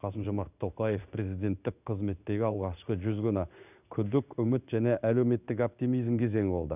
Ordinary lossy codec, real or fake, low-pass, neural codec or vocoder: none; fake; 3.6 kHz; codec, 16 kHz in and 24 kHz out, 1 kbps, XY-Tokenizer